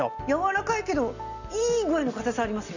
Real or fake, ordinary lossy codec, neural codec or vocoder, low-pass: real; none; none; 7.2 kHz